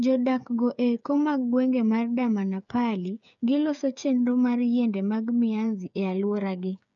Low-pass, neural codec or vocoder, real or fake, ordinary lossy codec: 7.2 kHz; codec, 16 kHz, 8 kbps, FreqCodec, smaller model; fake; none